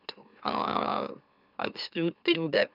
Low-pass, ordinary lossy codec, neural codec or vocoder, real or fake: 5.4 kHz; none; autoencoder, 44.1 kHz, a latent of 192 numbers a frame, MeloTTS; fake